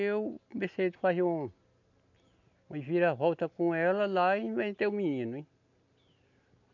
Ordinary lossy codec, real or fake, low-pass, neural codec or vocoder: MP3, 64 kbps; real; 7.2 kHz; none